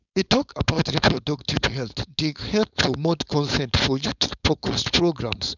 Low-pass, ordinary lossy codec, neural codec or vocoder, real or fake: 7.2 kHz; none; codec, 16 kHz, 4.8 kbps, FACodec; fake